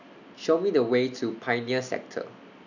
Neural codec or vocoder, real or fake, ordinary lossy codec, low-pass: none; real; none; 7.2 kHz